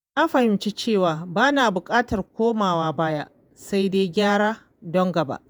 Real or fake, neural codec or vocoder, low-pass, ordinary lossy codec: fake; vocoder, 48 kHz, 128 mel bands, Vocos; none; none